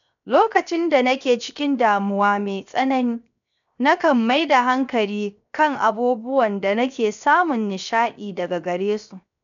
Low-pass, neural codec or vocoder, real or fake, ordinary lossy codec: 7.2 kHz; codec, 16 kHz, 0.7 kbps, FocalCodec; fake; none